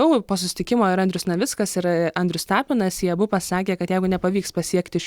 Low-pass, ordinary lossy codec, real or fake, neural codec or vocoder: 19.8 kHz; Opus, 64 kbps; real; none